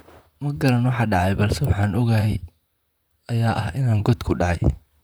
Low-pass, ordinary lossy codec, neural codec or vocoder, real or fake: none; none; vocoder, 44.1 kHz, 128 mel bands, Pupu-Vocoder; fake